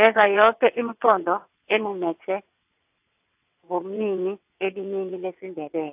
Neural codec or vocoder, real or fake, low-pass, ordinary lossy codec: vocoder, 22.05 kHz, 80 mel bands, WaveNeXt; fake; 3.6 kHz; AAC, 32 kbps